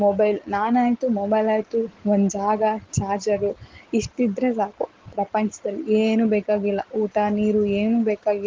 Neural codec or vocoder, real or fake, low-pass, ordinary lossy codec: none; real; 7.2 kHz; Opus, 16 kbps